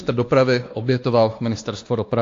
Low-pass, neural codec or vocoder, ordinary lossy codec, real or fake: 7.2 kHz; codec, 16 kHz, 2 kbps, X-Codec, WavLM features, trained on Multilingual LibriSpeech; AAC, 48 kbps; fake